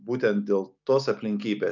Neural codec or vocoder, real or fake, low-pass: none; real; 7.2 kHz